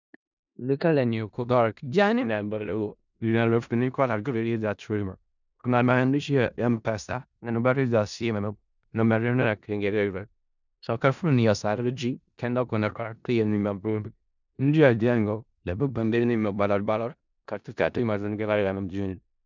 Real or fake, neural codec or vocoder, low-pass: fake; codec, 16 kHz in and 24 kHz out, 0.4 kbps, LongCat-Audio-Codec, four codebook decoder; 7.2 kHz